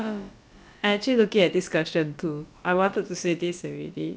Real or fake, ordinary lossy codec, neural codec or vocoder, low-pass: fake; none; codec, 16 kHz, about 1 kbps, DyCAST, with the encoder's durations; none